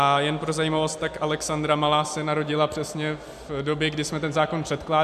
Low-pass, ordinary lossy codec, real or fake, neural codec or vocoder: 14.4 kHz; MP3, 96 kbps; real; none